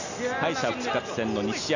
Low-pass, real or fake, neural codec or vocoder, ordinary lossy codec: 7.2 kHz; real; none; none